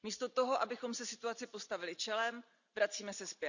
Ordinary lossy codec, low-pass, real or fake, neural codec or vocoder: none; 7.2 kHz; real; none